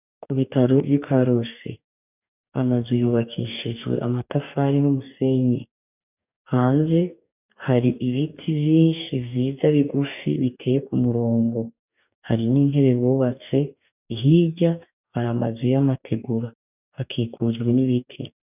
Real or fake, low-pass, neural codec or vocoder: fake; 3.6 kHz; codec, 44.1 kHz, 2.6 kbps, DAC